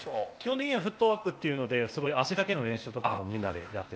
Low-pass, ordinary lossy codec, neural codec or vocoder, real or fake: none; none; codec, 16 kHz, 0.8 kbps, ZipCodec; fake